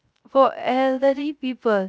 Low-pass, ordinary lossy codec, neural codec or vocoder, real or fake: none; none; codec, 16 kHz, 0.3 kbps, FocalCodec; fake